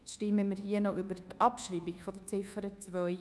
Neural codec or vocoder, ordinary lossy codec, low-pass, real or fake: codec, 24 kHz, 1.2 kbps, DualCodec; none; none; fake